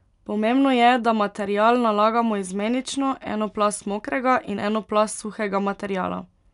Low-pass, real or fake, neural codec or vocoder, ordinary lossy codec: 10.8 kHz; real; none; none